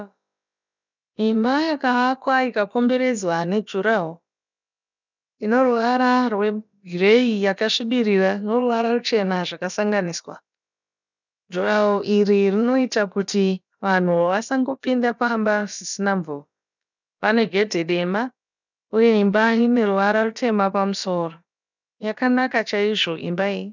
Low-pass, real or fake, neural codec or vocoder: 7.2 kHz; fake; codec, 16 kHz, about 1 kbps, DyCAST, with the encoder's durations